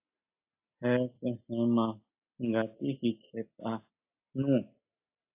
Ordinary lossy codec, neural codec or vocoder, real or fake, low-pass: AAC, 32 kbps; none; real; 3.6 kHz